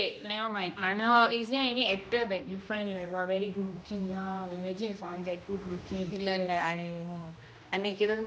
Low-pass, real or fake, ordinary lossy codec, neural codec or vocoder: none; fake; none; codec, 16 kHz, 1 kbps, X-Codec, HuBERT features, trained on general audio